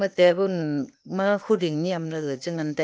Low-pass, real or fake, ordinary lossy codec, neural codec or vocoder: none; fake; none; codec, 16 kHz, 0.8 kbps, ZipCodec